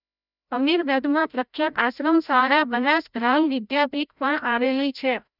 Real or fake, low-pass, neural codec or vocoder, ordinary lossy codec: fake; 5.4 kHz; codec, 16 kHz, 0.5 kbps, FreqCodec, larger model; none